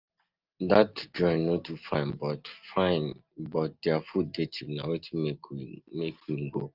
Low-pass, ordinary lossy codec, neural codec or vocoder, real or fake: 5.4 kHz; Opus, 24 kbps; none; real